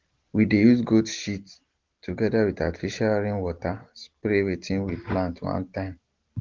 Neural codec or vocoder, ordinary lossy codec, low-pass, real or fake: none; Opus, 16 kbps; 7.2 kHz; real